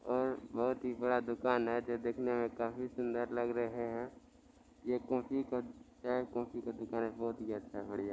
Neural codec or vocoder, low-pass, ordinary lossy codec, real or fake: none; none; none; real